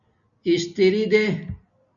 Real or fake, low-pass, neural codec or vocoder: real; 7.2 kHz; none